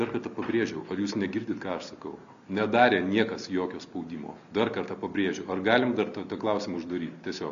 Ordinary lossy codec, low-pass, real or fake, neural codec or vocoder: AAC, 96 kbps; 7.2 kHz; real; none